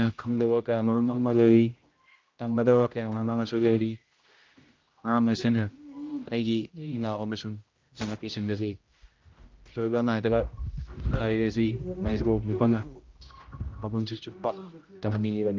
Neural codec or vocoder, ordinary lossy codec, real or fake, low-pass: codec, 16 kHz, 0.5 kbps, X-Codec, HuBERT features, trained on general audio; Opus, 24 kbps; fake; 7.2 kHz